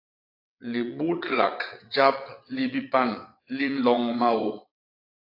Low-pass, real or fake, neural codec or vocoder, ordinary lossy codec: 5.4 kHz; fake; vocoder, 22.05 kHz, 80 mel bands, WaveNeXt; AAC, 32 kbps